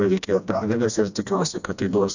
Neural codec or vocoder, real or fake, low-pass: codec, 16 kHz, 1 kbps, FreqCodec, smaller model; fake; 7.2 kHz